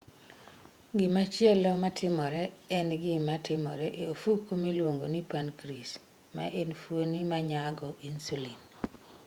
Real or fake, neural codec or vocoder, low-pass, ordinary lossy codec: fake; vocoder, 48 kHz, 128 mel bands, Vocos; 19.8 kHz; Opus, 64 kbps